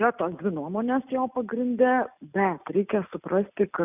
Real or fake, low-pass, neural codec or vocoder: real; 3.6 kHz; none